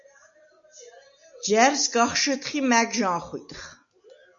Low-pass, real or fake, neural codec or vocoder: 7.2 kHz; real; none